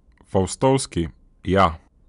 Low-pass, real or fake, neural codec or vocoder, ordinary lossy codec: 10.8 kHz; real; none; none